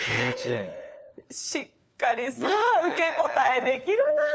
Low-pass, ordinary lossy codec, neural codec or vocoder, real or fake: none; none; codec, 16 kHz, 4 kbps, FunCodec, trained on LibriTTS, 50 frames a second; fake